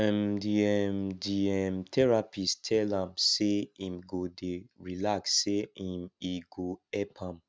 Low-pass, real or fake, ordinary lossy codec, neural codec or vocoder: none; real; none; none